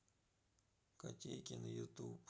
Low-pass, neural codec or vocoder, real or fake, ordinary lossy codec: none; none; real; none